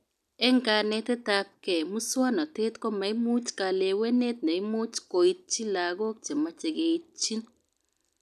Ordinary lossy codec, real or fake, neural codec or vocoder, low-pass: none; real; none; 14.4 kHz